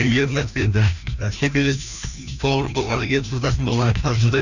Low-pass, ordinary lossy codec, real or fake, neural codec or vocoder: 7.2 kHz; none; fake; codec, 16 kHz, 1 kbps, FreqCodec, larger model